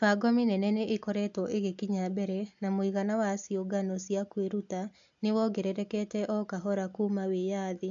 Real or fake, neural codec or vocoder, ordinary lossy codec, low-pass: real; none; AAC, 64 kbps; 7.2 kHz